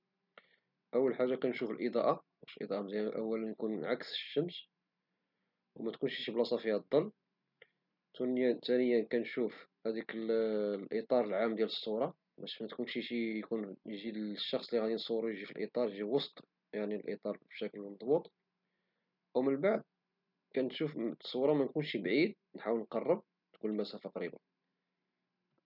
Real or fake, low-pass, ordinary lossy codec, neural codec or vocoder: real; 5.4 kHz; none; none